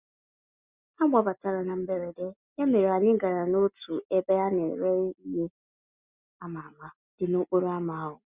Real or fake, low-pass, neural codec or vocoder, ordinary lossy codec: real; 3.6 kHz; none; Opus, 64 kbps